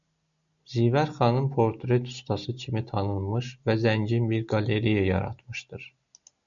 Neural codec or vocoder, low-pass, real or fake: none; 7.2 kHz; real